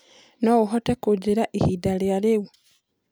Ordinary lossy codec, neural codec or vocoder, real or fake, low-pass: none; none; real; none